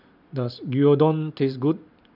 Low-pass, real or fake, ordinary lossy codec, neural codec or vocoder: 5.4 kHz; real; none; none